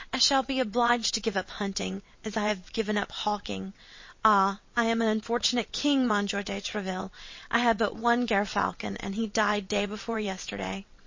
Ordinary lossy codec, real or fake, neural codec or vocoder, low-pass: MP3, 32 kbps; fake; vocoder, 22.05 kHz, 80 mel bands, WaveNeXt; 7.2 kHz